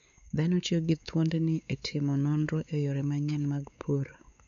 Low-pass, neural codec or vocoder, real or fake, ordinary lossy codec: 7.2 kHz; codec, 16 kHz, 4 kbps, X-Codec, WavLM features, trained on Multilingual LibriSpeech; fake; none